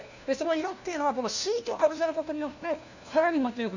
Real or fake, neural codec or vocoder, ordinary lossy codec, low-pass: fake; codec, 16 kHz, 1 kbps, FunCodec, trained on LibriTTS, 50 frames a second; none; 7.2 kHz